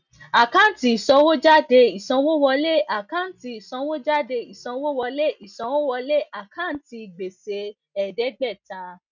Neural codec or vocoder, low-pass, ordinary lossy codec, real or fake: none; 7.2 kHz; none; real